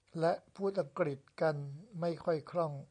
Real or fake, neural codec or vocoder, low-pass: real; none; 9.9 kHz